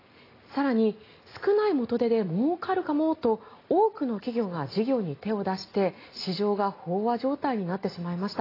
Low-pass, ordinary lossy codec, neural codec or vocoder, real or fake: 5.4 kHz; AAC, 24 kbps; none; real